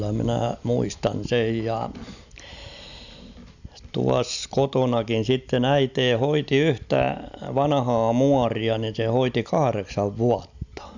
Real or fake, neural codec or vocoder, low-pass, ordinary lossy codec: real; none; 7.2 kHz; none